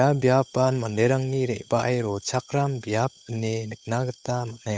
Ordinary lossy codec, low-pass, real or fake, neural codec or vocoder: none; none; fake; codec, 16 kHz, 8 kbps, FunCodec, trained on Chinese and English, 25 frames a second